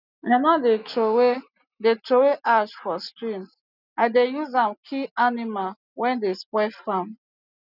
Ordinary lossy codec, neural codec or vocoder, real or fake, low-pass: none; none; real; 5.4 kHz